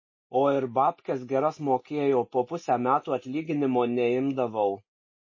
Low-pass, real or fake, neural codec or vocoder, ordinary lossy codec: 7.2 kHz; real; none; MP3, 32 kbps